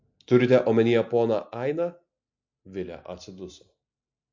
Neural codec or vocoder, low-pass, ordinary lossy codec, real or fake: none; 7.2 kHz; MP3, 48 kbps; real